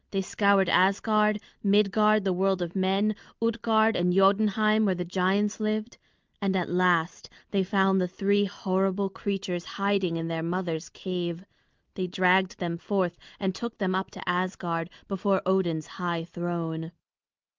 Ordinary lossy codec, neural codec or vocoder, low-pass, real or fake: Opus, 32 kbps; none; 7.2 kHz; real